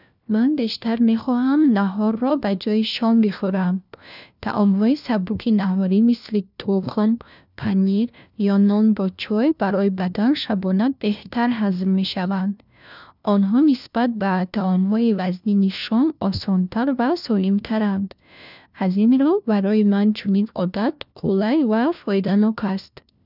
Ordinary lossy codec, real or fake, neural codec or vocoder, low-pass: none; fake; codec, 16 kHz, 1 kbps, FunCodec, trained on LibriTTS, 50 frames a second; 5.4 kHz